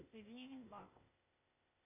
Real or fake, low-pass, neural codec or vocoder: fake; 3.6 kHz; codec, 16 kHz, 0.8 kbps, ZipCodec